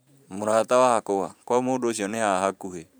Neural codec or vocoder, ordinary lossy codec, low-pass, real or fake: vocoder, 44.1 kHz, 128 mel bands every 512 samples, BigVGAN v2; none; none; fake